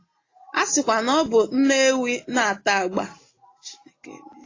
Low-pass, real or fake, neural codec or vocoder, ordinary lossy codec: 7.2 kHz; real; none; AAC, 32 kbps